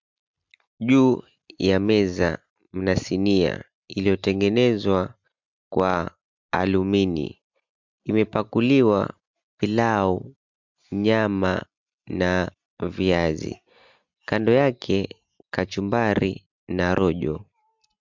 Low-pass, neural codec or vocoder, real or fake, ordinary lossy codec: 7.2 kHz; none; real; MP3, 64 kbps